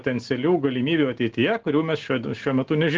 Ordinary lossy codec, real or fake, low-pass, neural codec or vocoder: Opus, 16 kbps; real; 7.2 kHz; none